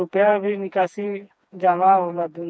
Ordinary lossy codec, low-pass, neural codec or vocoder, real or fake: none; none; codec, 16 kHz, 2 kbps, FreqCodec, smaller model; fake